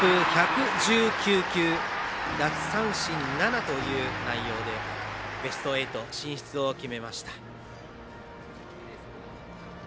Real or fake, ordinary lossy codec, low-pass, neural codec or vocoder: real; none; none; none